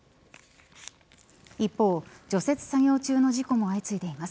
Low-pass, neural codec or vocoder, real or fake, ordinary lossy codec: none; none; real; none